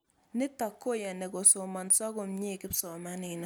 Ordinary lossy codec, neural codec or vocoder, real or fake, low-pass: none; none; real; none